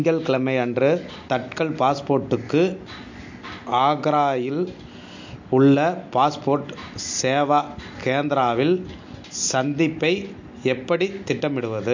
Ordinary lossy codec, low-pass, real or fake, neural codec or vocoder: MP3, 48 kbps; 7.2 kHz; real; none